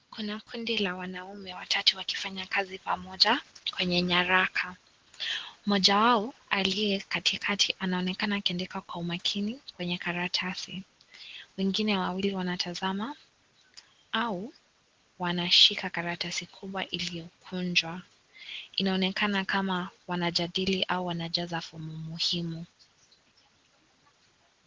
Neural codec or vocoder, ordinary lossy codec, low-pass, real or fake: none; Opus, 16 kbps; 7.2 kHz; real